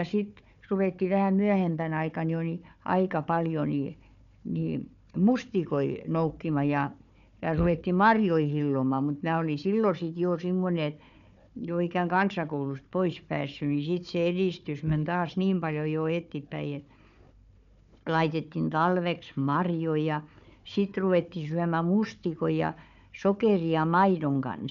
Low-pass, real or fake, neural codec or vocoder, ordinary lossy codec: 7.2 kHz; fake; codec, 16 kHz, 4 kbps, FunCodec, trained on Chinese and English, 50 frames a second; none